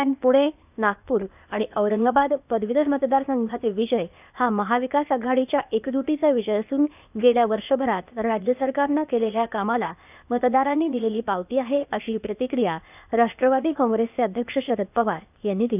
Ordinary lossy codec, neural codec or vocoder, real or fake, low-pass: none; codec, 16 kHz, 0.8 kbps, ZipCodec; fake; 3.6 kHz